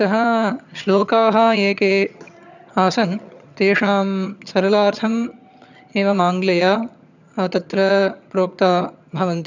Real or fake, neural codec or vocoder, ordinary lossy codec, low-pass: fake; vocoder, 22.05 kHz, 80 mel bands, HiFi-GAN; none; 7.2 kHz